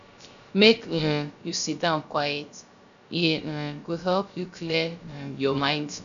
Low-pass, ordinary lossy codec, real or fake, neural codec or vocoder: 7.2 kHz; none; fake; codec, 16 kHz, 0.3 kbps, FocalCodec